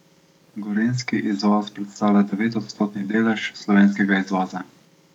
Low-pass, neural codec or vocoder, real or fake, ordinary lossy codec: 19.8 kHz; codec, 44.1 kHz, 7.8 kbps, DAC; fake; none